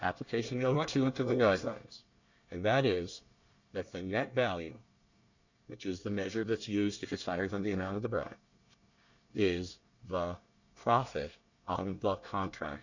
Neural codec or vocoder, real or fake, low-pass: codec, 24 kHz, 1 kbps, SNAC; fake; 7.2 kHz